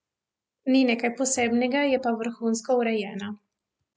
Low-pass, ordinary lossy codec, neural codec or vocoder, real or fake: none; none; none; real